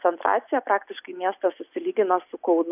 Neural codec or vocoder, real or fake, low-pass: none; real; 3.6 kHz